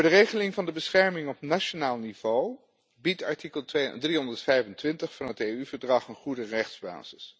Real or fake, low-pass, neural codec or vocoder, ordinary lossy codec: real; none; none; none